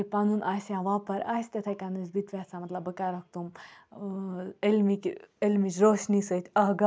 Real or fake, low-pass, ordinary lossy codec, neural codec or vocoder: real; none; none; none